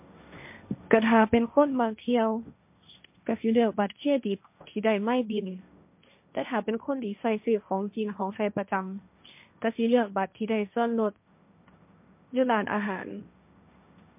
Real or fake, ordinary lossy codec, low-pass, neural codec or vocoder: fake; MP3, 32 kbps; 3.6 kHz; codec, 16 kHz, 1.1 kbps, Voila-Tokenizer